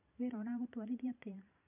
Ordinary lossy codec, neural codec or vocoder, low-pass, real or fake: none; vocoder, 44.1 kHz, 128 mel bands every 512 samples, BigVGAN v2; 3.6 kHz; fake